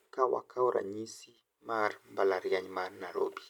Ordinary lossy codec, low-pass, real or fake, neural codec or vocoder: none; 19.8 kHz; real; none